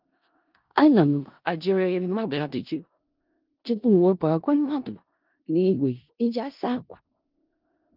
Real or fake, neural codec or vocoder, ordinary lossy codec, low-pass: fake; codec, 16 kHz in and 24 kHz out, 0.4 kbps, LongCat-Audio-Codec, four codebook decoder; Opus, 32 kbps; 5.4 kHz